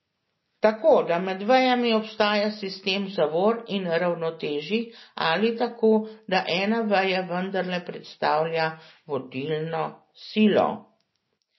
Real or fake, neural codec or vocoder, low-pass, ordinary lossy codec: real; none; 7.2 kHz; MP3, 24 kbps